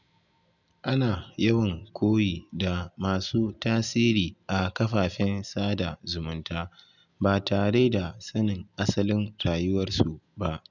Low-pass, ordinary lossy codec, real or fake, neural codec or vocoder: 7.2 kHz; none; real; none